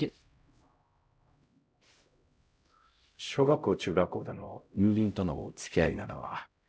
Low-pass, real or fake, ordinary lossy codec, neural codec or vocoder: none; fake; none; codec, 16 kHz, 0.5 kbps, X-Codec, HuBERT features, trained on LibriSpeech